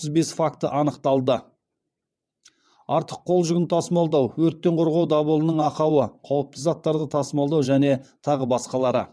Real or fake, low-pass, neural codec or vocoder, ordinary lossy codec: fake; none; vocoder, 22.05 kHz, 80 mel bands, WaveNeXt; none